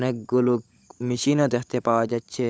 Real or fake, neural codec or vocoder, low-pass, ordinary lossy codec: fake; codec, 16 kHz, 16 kbps, FunCodec, trained on LibriTTS, 50 frames a second; none; none